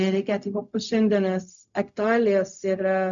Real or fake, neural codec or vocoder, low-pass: fake; codec, 16 kHz, 0.4 kbps, LongCat-Audio-Codec; 7.2 kHz